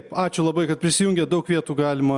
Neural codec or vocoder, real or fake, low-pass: none; real; 10.8 kHz